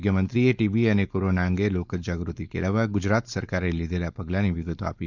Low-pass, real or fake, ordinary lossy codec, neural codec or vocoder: 7.2 kHz; fake; none; codec, 16 kHz, 4.8 kbps, FACodec